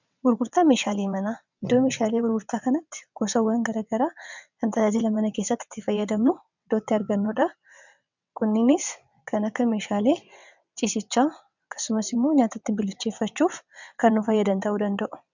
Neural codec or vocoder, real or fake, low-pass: vocoder, 22.05 kHz, 80 mel bands, WaveNeXt; fake; 7.2 kHz